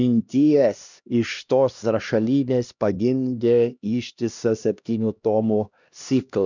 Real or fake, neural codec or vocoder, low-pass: fake; codec, 16 kHz, 1 kbps, X-Codec, HuBERT features, trained on LibriSpeech; 7.2 kHz